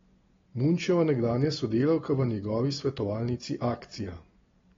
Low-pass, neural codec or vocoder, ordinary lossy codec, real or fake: 7.2 kHz; none; AAC, 24 kbps; real